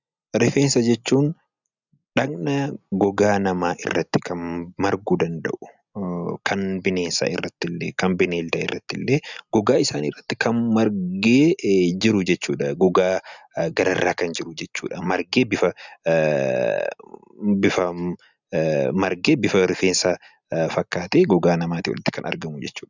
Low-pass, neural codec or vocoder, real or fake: 7.2 kHz; none; real